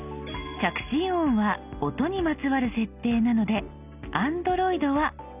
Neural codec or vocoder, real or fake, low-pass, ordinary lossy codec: none; real; 3.6 kHz; none